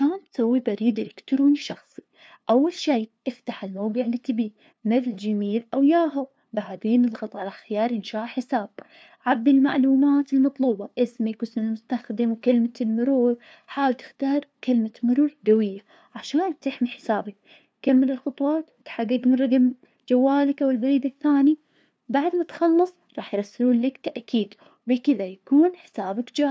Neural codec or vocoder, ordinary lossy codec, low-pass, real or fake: codec, 16 kHz, 2 kbps, FunCodec, trained on LibriTTS, 25 frames a second; none; none; fake